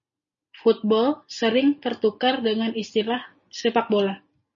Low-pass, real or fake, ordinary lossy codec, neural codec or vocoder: 7.2 kHz; real; MP3, 32 kbps; none